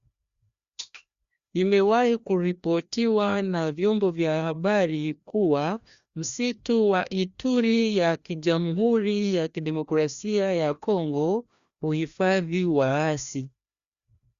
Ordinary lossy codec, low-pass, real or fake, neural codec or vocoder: Opus, 64 kbps; 7.2 kHz; fake; codec, 16 kHz, 1 kbps, FreqCodec, larger model